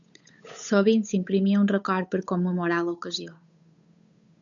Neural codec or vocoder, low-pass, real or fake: codec, 16 kHz, 8 kbps, FunCodec, trained on Chinese and English, 25 frames a second; 7.2 kHz; fake